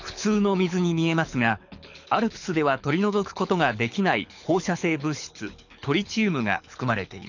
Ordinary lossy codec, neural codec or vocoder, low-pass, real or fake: none; codec, 24 kHz, 6 kbps, HILCodec; 7.2 kHz; fake